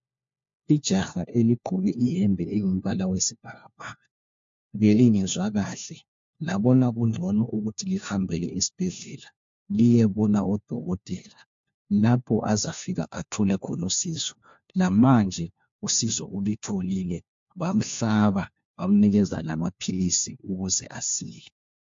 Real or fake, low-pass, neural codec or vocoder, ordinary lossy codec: fake; 7.2 kHz; codec, 16 kHz, 1 kbps, FunCodec, trained on LibriTTS, 50 frames a second; MP3, 48 kbps